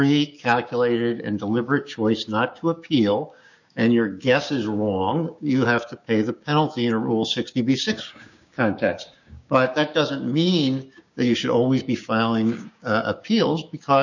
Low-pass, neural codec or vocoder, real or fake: 7.2 kHz; codec, 16 kHz, 6 kbps, DAC; fake